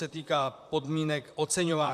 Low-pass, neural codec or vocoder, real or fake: 14.4 kHz; vocoder, 44.1 kHz, 128 mel bands, Pupu-Vocoder; fake